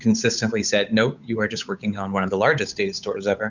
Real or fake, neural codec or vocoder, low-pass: fake; codec, 16 kHz, 8 kbps, FunCodec, trained on Chinese and English, 25 frames a second; 7.2 kHz